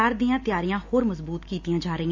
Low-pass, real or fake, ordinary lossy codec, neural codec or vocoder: 7.2 kHz; real; AAC, 48 kbps; none